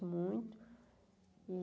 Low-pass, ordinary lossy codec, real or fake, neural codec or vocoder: none; none; real; none